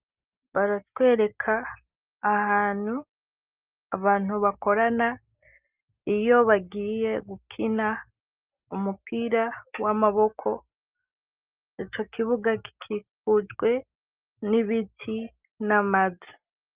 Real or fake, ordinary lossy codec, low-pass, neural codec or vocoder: real; Opus, 24 kbps; 3.6 kHz; none